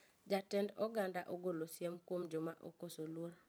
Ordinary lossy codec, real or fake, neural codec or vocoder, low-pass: none; fake; vocoder, 44.1 kHz, 128 mel bands every 512 samples, BigVGAN v2; none